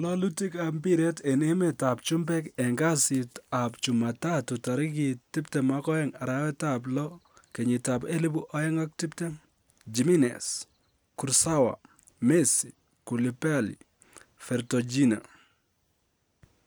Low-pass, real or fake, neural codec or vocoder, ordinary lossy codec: none; real; none; none